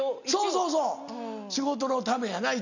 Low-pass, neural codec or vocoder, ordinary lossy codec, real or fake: 7.2 kHz; none; none; real